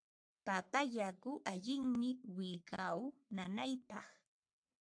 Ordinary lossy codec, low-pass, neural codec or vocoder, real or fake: AAC, 64 kbps; 9.9 kHz; codec, 44.1 kHz, 3.4 kbps, Pupu-Codec; fake